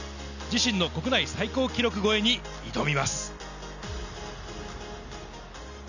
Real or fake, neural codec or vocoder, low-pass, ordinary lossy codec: real; none; 7.2 kHz; none